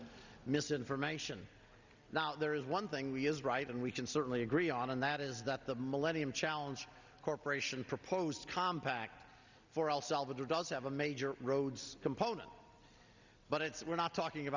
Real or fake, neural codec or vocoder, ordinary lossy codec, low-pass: real; none; Opus, 64 kbps; 7.2 kHz